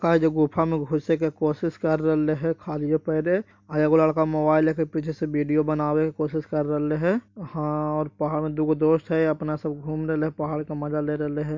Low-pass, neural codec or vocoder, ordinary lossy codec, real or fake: 7.2 kHz; none; MP3, 48 kbps; real